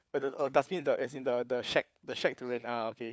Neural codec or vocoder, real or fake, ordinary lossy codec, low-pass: codec, 16 kHz, 4 kbps, FunCodec, trained on LibriTTS, 50 frames a second; fake; none; none